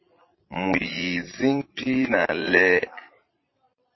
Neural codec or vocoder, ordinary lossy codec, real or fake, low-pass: vocoder, 22.05 kHz, 80 mel bands, Vocos; MP3, 24 kbps; fake; 7.2 kHz